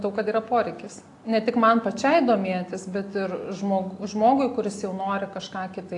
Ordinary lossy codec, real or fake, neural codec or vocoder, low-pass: AAC, 48 kbps; real; none; 10.8 kHz